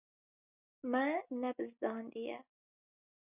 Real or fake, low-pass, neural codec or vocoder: real; 3.6 kHz; none